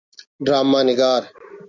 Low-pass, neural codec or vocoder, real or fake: 7.2 kHz; none; real